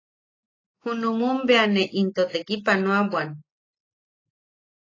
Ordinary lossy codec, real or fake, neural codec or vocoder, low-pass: AAC, 32 kbps; real; none; 7.2 kHz